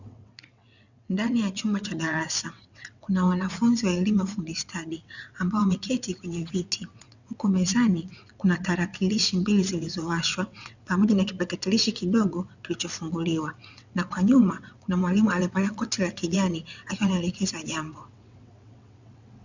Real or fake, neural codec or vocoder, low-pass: fake; vocoder, 22.05 kHz, 80 mel bands, WaveNeXt; 7.2 kHz